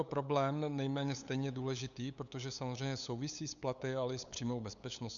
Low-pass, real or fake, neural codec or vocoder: 7.2 kHz; fake; codec, 16 kHz, 8 kbps, FunCodec, trained on LibriTTS, 25 frames a second